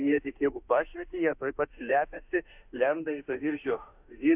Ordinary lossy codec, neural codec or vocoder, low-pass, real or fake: AAC, 32 kbps; autoencoder, 48 kHz, 32 numbers a frame, DAC-VAE, trained on Japanese speech; 3.6 kHz; fake